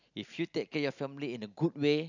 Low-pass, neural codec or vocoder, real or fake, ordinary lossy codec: 7.2 kHz; none; real; none